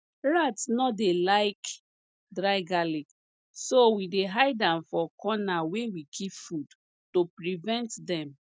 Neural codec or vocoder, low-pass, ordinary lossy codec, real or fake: none; none; none; real